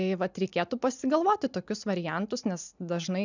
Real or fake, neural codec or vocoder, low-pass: real; none; 7.2 kHz